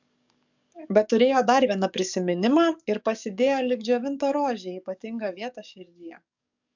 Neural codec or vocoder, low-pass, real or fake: codec, 44.1 kHz, 7.8 kbps, DAC; 7.2 kHz; fake